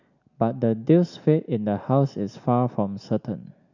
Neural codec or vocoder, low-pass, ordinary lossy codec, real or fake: none; 7.2 kHz; none; real